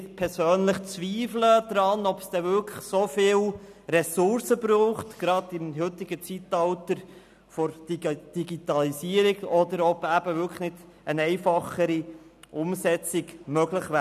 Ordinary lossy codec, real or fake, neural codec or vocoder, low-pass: none; real; none; 14.4 kHz